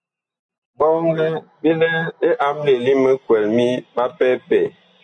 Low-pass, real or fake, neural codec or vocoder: 9.9 kHz; real; none